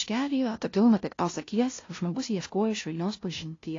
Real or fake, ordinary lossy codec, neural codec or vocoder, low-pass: fake; AAC, 32 kbps; codec, 16 kHz, 0.5 kbps, FunCodec, trained on LibriTTS, 25 frames a second; 7.2 kHz